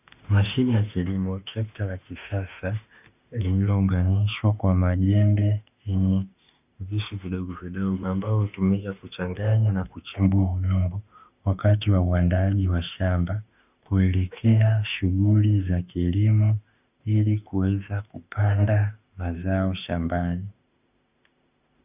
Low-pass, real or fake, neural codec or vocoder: 3.6 kHz; fake; autoencoder, 48 kHz, 32 numbers a frame, DAC-VAE, trained on Japanese speech